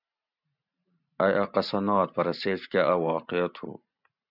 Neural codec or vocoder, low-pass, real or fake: vocoder, 44.1 kHz, 128 mel bands every 512 samples, BigVGAN v2; 5.4 kHz; fake